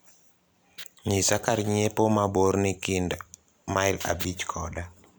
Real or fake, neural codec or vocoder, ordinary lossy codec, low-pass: fake; vocoder, 44.1 kHz, 128 mel bands every 256 samples, BigVGAN v2; none; none